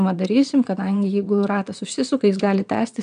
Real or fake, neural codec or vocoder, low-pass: fake; vocoder, 22.05 kHz, 80 mel bands, WaveNeXt; 9.9 kHz